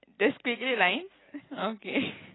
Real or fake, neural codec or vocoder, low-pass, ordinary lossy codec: real; none; 7.2 kHz; AAC, 16 kbps